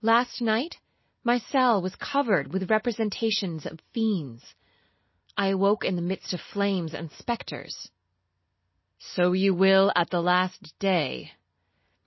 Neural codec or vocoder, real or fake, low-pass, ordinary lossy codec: none; real; 7.2 kHz; MP3, 24 kbps